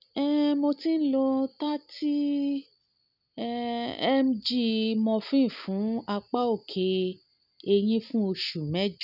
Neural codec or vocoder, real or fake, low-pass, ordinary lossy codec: none; real; 5.4 kHz; none